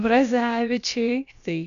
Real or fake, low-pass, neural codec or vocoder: fake; 7.2 kHz; codec, 16 kHz, about 1 kbps, DyCAST, with the encoder's durations